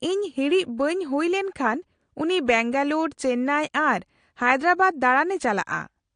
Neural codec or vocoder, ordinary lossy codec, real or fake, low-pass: none; AAC, 48 kbps; real; 9.9 kHz